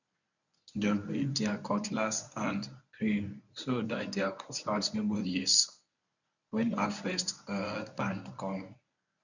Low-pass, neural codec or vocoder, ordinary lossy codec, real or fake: 7.2 kHz; codec, 24 kHz, 0.9 kbps, WavTokenizer, medium speech release version 1; none; fake